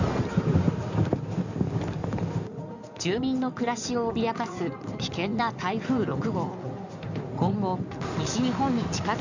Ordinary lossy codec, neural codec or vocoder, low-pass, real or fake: none; codec, 16 kHz in and 24 kHz out, 2.2 kbps, FireRedTTS-2 codec; 7.2 kHz; fake